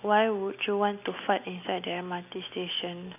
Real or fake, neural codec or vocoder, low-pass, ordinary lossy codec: real; none; 3.6 kHz; none